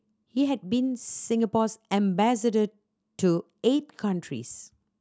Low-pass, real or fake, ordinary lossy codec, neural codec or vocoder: none; real; none; none